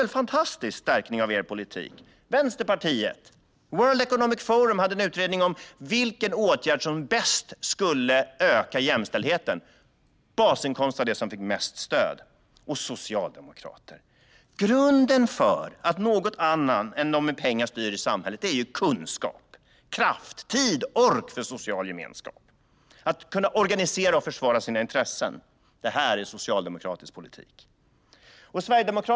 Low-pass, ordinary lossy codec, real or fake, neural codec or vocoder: none; none; real; none